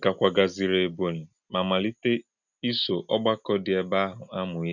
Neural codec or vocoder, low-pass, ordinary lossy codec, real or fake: none; 7.2 kHz; none; real